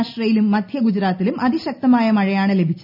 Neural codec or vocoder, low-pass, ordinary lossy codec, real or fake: none; 5.4 kHz; none; real